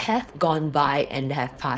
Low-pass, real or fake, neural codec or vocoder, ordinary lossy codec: none; fake; codec, 16 kHz, 4.8 kbps, FACodec; none